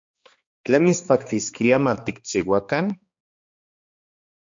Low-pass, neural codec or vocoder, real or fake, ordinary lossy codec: 7.2 kHz; codec, 16 kHz, 2 kbps, X-Codec, HuBERT features, trained on balanced general audio; fake; MP3, 48 kbps